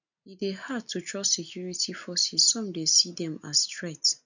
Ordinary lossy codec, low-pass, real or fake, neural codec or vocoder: none; 7.2 kHz; real; none